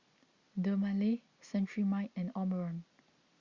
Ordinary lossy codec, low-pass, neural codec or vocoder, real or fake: Opus, 64 kbps; 7.2 kHz; none; real